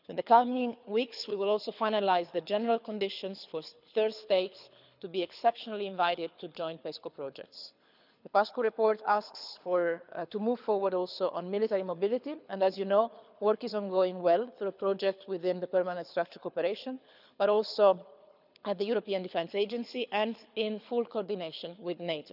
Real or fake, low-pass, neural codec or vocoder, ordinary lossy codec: fake; 5.4 kHz; codec, 24 kHz, 6 kbps, HILCodec; none